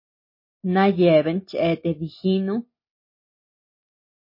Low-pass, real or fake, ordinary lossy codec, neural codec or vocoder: 5.4 kHz; real; MP3, 24 kbps; none